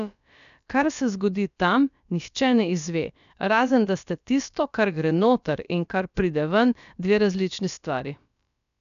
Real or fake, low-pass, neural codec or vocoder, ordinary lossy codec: fake; 7.2 kHz; codec, 16 kHz, about 1 kbps, DyCAST, with the encoder's durations; none